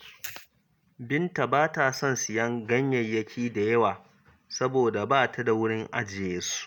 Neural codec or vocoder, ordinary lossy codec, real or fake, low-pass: none; none; real; none